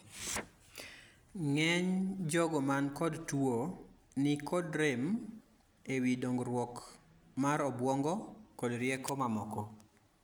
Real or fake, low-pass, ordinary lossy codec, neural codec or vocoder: real; none; none; none